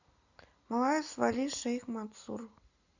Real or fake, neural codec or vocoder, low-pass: real; none; 7.2 kHz